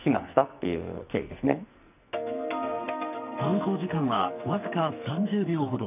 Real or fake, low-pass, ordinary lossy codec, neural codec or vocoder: fake; 3.6 kHz; none; codec, 44.1 kHz, 2.6 kbps, SNAC